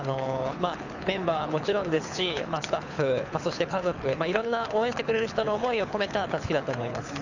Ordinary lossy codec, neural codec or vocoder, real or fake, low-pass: none; codec, 24 kHz, 6 kbps, HILCodec; fake; 7.2 kHz